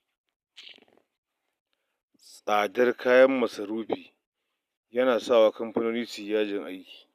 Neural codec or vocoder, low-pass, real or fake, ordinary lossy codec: none; 14.4 kHz; real; none